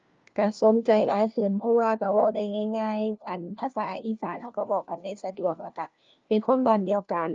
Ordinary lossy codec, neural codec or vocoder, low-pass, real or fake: Opus, 24 kbps; codec, 16 kHz, 1 kbps, FunCodec, trained on LibriTTS, 50 frames a second; 7.2 kHz; fake